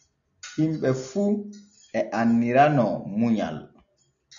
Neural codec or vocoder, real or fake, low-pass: none; real; 7.2 kHz